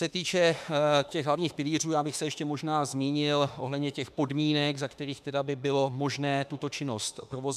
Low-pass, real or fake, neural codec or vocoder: 14.4 kHz; fake; autoencoder, 48 kHz, 32 numbers a frame, DAC-VAE, trained on Japanese speech